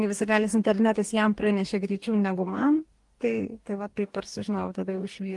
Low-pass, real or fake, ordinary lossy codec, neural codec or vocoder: 10.8 kHz; fake; Opus, 24 kbps; codec, 44.1 kHz, 2.6 kbps, DAC